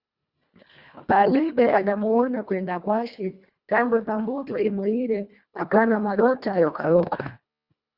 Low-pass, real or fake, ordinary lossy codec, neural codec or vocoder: 5.4 kHz; fake; Opus, 64 kbps; codec, 24 kHz, 1.5 kbps, HILCodec